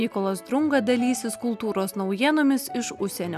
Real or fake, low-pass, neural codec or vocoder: real; 14.4 kHz; none